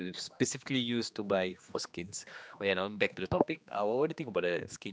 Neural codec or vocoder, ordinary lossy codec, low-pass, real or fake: codec, 16 kHz, 2 kbps, X-Codec, HuBERT features, trained on general audio; none; none; fake